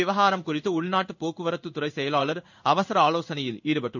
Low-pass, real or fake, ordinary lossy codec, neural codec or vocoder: 7.2 kHz; fake; none; codec, 16 kHz in and 24 kHz out, 1 kbps, XY-Tokenizer